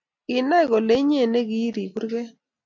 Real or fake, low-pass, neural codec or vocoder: real; 7.2 kHz; none